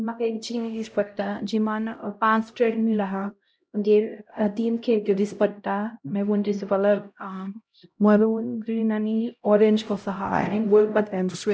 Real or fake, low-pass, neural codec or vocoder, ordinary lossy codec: fake; none; codec, 16 kHz, 0.5 kbps, X-Codec, HuBERT features, trained on LibriSpeech; none